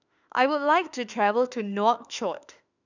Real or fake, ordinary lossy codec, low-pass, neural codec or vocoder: fake; none; 7.2 kHz; autoencoder, 48 kHz, 32 numbers a frame, DAC-VAE, trained on Japanese speech